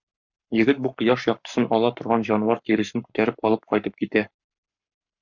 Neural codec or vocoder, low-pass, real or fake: codec, 44.1 kHz, 7.8 kbps, Pupu-Codec; 7.2 kHz; fake